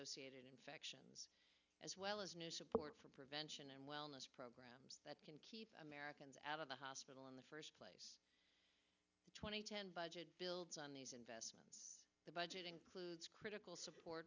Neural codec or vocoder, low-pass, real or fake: none; 7.2 kHz; real